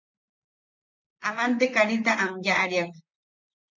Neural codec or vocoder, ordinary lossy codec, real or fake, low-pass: vocoder, 44.1 kHz, 128 mel bands, Pupu-Vocoder; MP3, 64 kbps; fake; 7.2 kHz